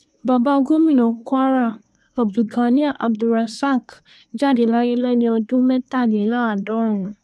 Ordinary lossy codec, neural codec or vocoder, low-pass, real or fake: none; codec, 24 kHz, 1 kbps, SNAC; none; fake